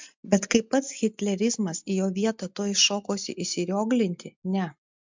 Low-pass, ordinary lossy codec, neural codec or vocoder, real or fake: 7.2 kHz; MP3, 64 kbps; none; real